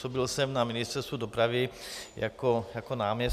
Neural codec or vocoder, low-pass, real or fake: none; 14.4 kHz; real